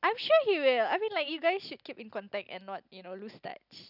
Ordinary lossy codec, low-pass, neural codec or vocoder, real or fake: none; 5.4 kHz; none; real